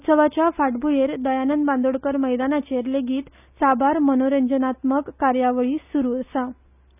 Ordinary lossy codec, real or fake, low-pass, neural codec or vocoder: none; real; 3.6 kHz; none